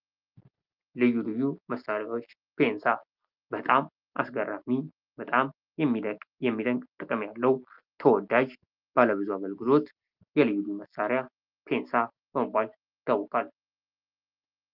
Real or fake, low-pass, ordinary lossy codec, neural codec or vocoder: real; 5.4 kHz; Opus, 32 kbps; none